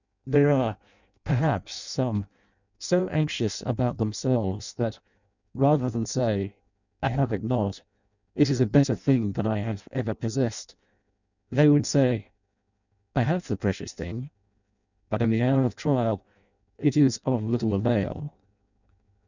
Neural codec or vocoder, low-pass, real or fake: codec, 16 kHz in and 24 kHz out, 0.6 kbps, FireRedTTS-2 codec; 7.2 kHz; fake